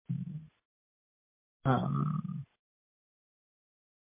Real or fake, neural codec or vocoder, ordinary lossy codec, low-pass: fake; vocoder, 44.1 kHz, 128 mel bands every 512 samples, BigVGAN v2; MP3, 24 kbps; 3.6 kHz